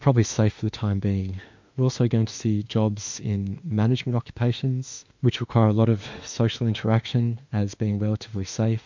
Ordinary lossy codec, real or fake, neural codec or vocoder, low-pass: MP3, 64 kbps; fake; autoencoder, 48 kHz, 32 numbers a frame, DAC-VAE, trained on Japanese speech; 7.2 kHz